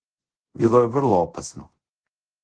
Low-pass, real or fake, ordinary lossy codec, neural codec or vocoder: 9.9 kHz; fake; Opus, 16 kbps; codec, 24 kHz, 0.5 kbps, DualCodec